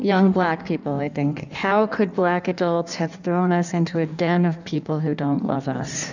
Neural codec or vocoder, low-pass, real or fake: codec, 16 kHz in and 24 kHz out, 1.1 kbps, FireRedTTS-2 codec; 7.2 kHz; fake